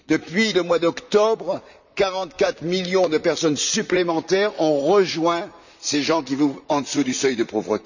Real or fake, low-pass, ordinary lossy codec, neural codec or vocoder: fake; 7.2 kHz; none; vocoder, 44.1 kHz, 128 mel bands, Pupu-Vocoder